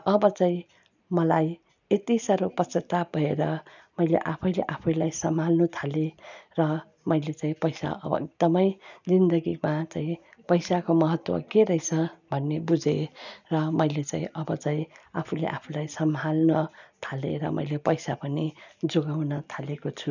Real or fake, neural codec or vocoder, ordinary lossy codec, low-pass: real; none; none; 7.2 kHz